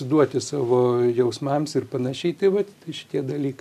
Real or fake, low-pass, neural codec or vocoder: real; 14.4 kHz; none